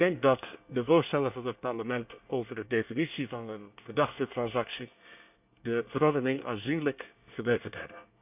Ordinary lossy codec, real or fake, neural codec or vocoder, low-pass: none; fake; codec, 24 kHz, 1 kbps, SNAC; 3.6 kHz